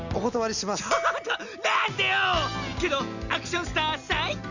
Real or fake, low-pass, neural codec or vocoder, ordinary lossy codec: real; 7.2 kHz; none; none